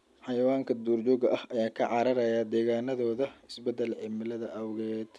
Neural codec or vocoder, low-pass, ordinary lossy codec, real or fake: none; none; none; real